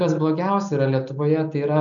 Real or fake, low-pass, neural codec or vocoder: real; 7.2 kHz; none